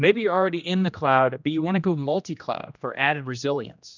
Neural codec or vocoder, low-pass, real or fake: codec, 16 kHz, 1 kbps, X-Codec, HuBERT features, trained on general audio; 7.2 kHz; fake